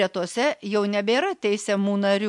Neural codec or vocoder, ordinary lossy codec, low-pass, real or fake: none; MP3, 64 kbps; 10.8 kHz; real